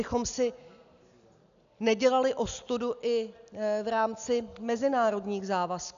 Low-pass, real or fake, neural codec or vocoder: 7.2 kHz; real; none